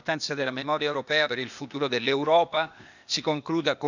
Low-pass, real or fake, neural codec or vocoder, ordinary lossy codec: 7.2 kHz; fake; codec, 16 kHz, 0.8 kbps, ZipCodec; none